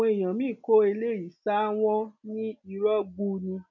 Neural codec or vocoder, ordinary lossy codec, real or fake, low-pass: none; none; real; 7.2 kHz